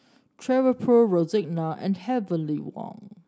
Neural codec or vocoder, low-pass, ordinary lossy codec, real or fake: none; none; none; real